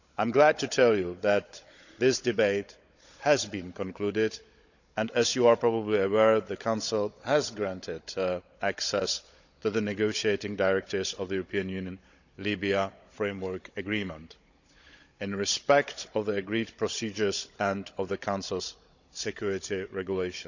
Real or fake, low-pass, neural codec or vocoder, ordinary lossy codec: fake; 7.2 kHz; codec, 16 kHz, 16 kbps, FunCodec, trained on Chinese and English, 50 frames a second; none